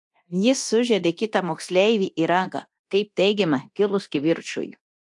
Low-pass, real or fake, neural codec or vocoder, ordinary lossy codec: 10.8 kHz; fake; codec, 24 kHz, 0.9 kbps, DualCodec; AAC, 64 kbps